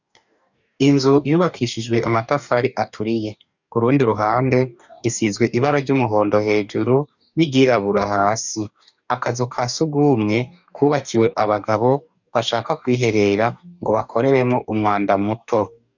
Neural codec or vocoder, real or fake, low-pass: codec, 44.1 kHz, 2.6 kbps, DAC; fake; 7.2 kHz